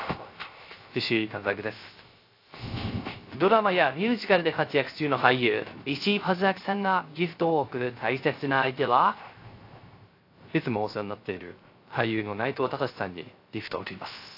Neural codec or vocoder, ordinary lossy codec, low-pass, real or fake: codec, 16 kHz, 0.3 kbps, FocalCodec; AAC, 32 kbps; 5.4 kHz; fake